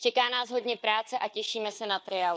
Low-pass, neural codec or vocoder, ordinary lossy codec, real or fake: none; codec, 16 kHz, 4 kbps, FunCodec, trained on Chinese and English, 50 frames a second; none; fake